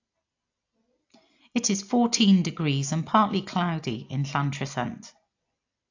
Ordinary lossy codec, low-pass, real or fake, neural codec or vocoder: AAC, 48 kbps; 7.2 kHz; real; none